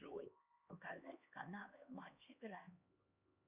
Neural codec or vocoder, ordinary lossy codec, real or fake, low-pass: codec, 16 kHz, 2 kbps, X-Codec, HuBERT features, trained on LibriSpeech; Opus, 64 kbps; fake; 3.6 kHz